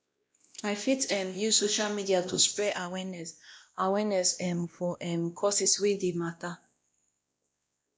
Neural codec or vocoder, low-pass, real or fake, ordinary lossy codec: codec, 16 kHz, 1 kbps, X-Codec, WavLM features, trained on Multilingual LibriSpeech; none; fake; none